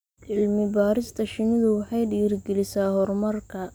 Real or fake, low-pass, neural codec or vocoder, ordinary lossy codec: real; none; none; none